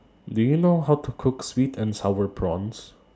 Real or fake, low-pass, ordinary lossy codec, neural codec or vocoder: real; none; none; none